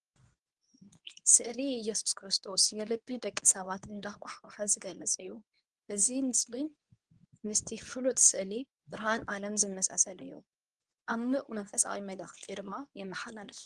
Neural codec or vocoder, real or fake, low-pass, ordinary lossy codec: codec, 24 kHz, 0.9 kbps, WavTokenizer, medium speech release version 2; fake; 10.8 kHz; Opus, 24 kbps